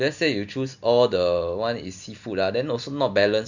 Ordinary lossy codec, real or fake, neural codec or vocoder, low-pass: none; real; none; 7.2 kHz